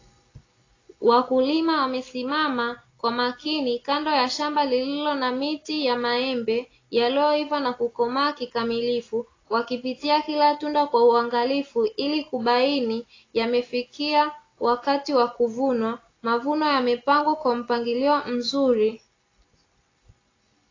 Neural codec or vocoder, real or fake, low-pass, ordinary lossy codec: none; real; 7.2 kHz; AAC, 32 kbps